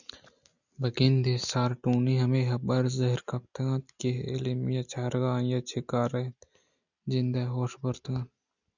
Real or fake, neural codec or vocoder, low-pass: real; none; 7.2 kHz